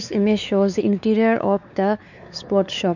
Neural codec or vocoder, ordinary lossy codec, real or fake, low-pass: codec, 16 kHz, 4 kbps, X-Codec, WavLM features, trained on Multilingual LibriSpeech; none; fake; 7.2 kHz